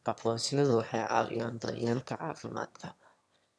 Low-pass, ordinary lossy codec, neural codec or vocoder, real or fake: none; none; autoencoder, 22.05 kHz, a latent of 192 numbers a frame, VITS, trained on one speaker; fake